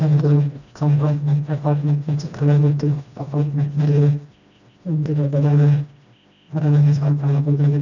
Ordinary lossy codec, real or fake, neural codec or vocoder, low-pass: none; fake; codec, 16 kHz, 1 kbps, FreqCodec, smaller model; 7.2 kHz